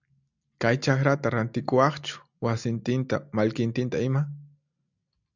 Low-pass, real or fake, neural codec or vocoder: 7.2 kHz; real; none